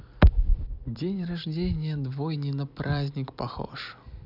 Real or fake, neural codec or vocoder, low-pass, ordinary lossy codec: fake; vocoder, 44.1 kHz, 128 mel bands every 256 samples, BigVGAN v2; 5.4 kHz; none